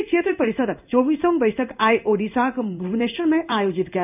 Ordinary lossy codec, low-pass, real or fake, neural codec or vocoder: none; 3.6 kHz; fake; codec, 16 kHz in and 24 kHz out, 1 kbps, XY-Tokenizer